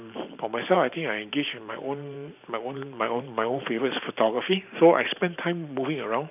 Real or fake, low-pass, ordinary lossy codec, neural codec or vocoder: fake; 3.6 kHz; none; vocoder, 44.1 kHz, 128 mel bands every 512 samples, BigVGAN v2